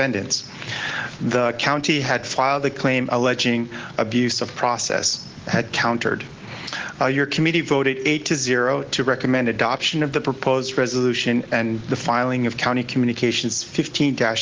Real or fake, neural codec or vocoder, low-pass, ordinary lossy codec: real; none; 7.2 kHz; Opus, 16 kbps